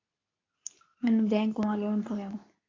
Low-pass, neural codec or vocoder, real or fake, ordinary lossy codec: 7.2 kHz; codec, 24 kHz, 0.9 kbps, WavTokenizer, medium speech release version 2; fake; AAC, 32 kbps